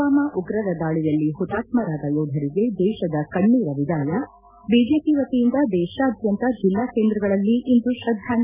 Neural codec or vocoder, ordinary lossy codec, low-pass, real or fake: none; none; 3.6 kHz; real